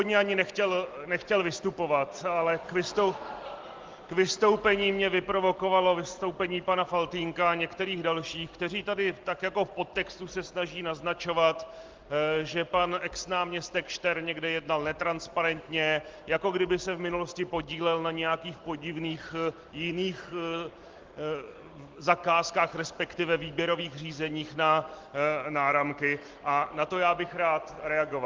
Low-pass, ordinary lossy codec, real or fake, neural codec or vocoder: 7.2 kHz; Opus, 16 kbps; real; none